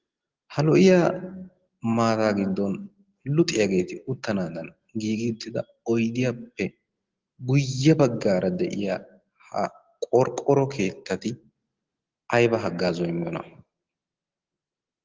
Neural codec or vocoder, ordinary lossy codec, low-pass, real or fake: none; Opus, 16 kbps; 7.2 kHz; real